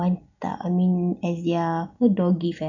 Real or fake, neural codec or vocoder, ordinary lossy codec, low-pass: real; none; none; 7.2 kHz